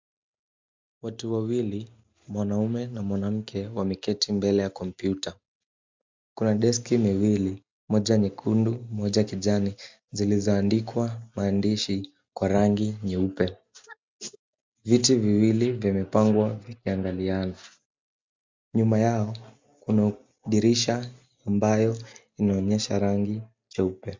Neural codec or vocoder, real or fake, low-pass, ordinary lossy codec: none; real; 7.2 kHz; MP3, 64 kbps